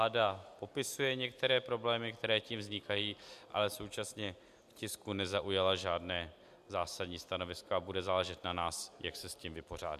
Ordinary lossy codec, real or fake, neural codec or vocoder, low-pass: MP3, 96 kbps; real; none; 14.4 kHz